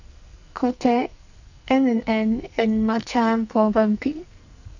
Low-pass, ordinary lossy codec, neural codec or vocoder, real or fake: 7.2 kHz; none; codec, 44.1 kHz, 2.6 kbps, SNAC; fake